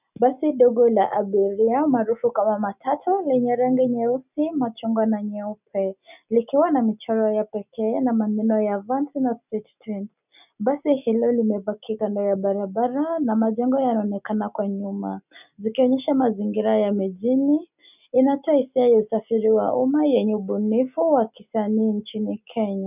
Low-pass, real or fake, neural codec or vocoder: 3.6 kHz; real; none